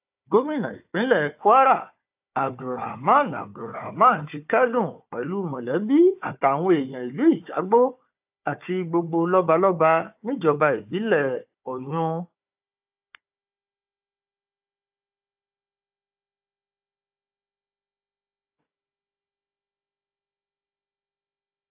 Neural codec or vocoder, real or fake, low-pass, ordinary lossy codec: codec, 16 kHz, 4 kbps, FunCodec, trained on Chinese and English, 50 frames a second; fake; 3.6 kHz; AAC, 32 kbps